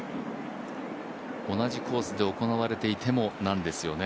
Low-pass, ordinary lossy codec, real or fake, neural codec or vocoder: none; none; real; none